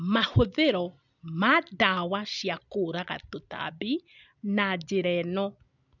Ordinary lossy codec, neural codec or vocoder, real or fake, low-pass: none; none; real; 7.2 kHz